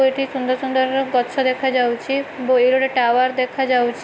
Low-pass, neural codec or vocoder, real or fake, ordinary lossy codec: none; none; real; none